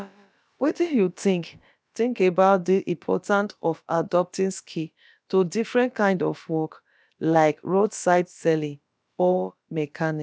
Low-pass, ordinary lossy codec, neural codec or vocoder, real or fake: none; none; codec, 16 kHz, about 1 kbps, DyCAST, with the encoder's durations; fake